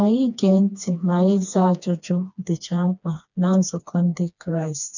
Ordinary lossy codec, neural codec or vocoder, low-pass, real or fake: none; codec, 16 kHz, 2 kbps, FreqCodec, smaller model; 7.2 kHz; fake